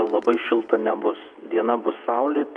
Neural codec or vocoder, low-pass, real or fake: vocoder, 22.05 kHz, 80 mel bands, WaveNeXt; 9.9 kHz; fake